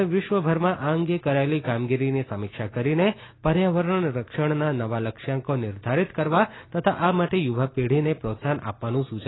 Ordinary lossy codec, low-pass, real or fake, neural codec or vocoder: AAC, 16 kbps; 7.2 kHz; real; none